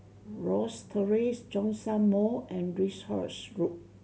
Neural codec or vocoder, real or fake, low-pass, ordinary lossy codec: none; real; none; none